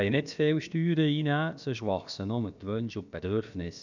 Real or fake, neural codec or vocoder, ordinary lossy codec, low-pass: fake; codec, 16 kHz, about 1 kbps, DyCAST, with the encoder's durations; none; 7.2 kHz